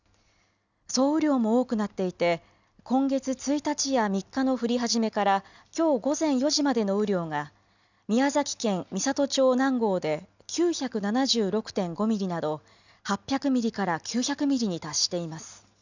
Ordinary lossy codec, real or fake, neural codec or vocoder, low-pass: none; real; none; 7.2 kHz